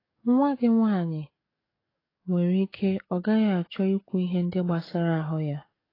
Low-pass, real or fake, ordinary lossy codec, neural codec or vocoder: 5.4 kHz; fake; AAC, 24 kbps; codec, 44.1 kHz, 7.8 kbps, DAC